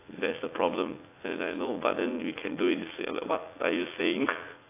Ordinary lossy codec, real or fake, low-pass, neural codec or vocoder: AAC, 24 kbps; fake; 3.6 kHz; vocoder, 44.1 kHz, 80 mel bands, Vocos